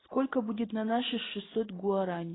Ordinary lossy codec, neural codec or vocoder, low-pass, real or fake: AAC, 16 kbps; none; 7.2 kHz; real